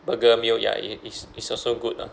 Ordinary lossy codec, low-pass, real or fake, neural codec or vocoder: none; none; real; none